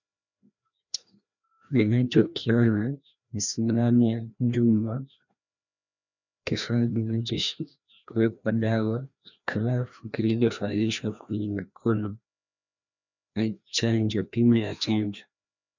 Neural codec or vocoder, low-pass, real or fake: codec, 16 kHz, 1 kbps, FreqCodec, larger model; 7.2 kHz; fake